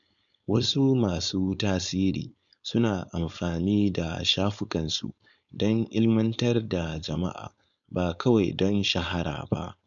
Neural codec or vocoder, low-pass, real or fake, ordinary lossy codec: codec, 16 kHz, 4.8 kbps, FACodec; 7.2 kHz; fake; none